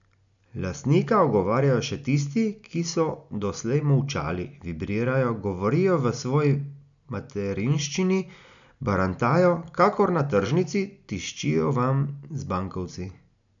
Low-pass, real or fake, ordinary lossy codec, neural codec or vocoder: 7.2 kHz; real; none; none